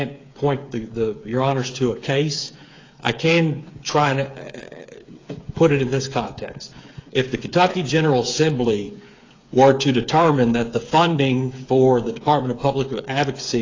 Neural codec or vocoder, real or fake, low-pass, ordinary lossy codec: codec, 16 kHz, 8 kbps, FreqCodec, smaller model; fake; 7.2 kHz; AAC, 32 kbps